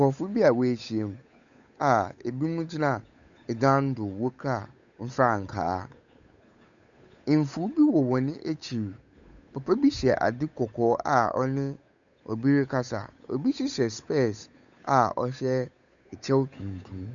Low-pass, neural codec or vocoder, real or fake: 7.2 kHz; codec, 16 kHz, 8 kbps, FunCodec, trained on Chinese and English, 25 frames a second; fake